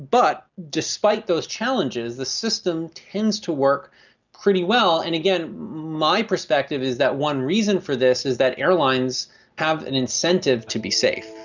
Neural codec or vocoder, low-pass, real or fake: none; 7.2 kHz; real